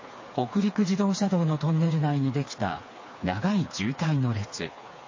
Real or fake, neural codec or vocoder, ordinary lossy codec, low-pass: fake; codec, 16 kHz, 4 kbps, FreqCodec, smaller model; MP3, 32 kbps; 7.2 kHz